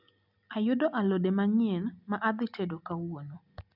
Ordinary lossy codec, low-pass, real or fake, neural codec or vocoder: none; 5.4 kHz; real; none